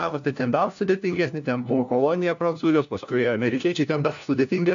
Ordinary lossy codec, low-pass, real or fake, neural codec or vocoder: AAC, 64 kbps; 7.2 kHz; fake; codec, 16 kHz, 1 kbps, FunCodec, trained on LibriTTS, 50 frames a second